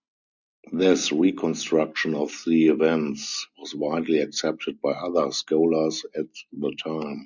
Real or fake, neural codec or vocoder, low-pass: real; none; 7.2 kHz